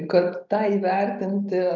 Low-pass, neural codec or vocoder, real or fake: 7.2 kHz; none; real